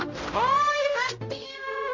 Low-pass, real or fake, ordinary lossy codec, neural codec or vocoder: 7.2 kHz; fake; MP3, 48 kbps; codec, 16 kHz, 0.5 kbps, X-Codec, HuBERT features, trained on general audio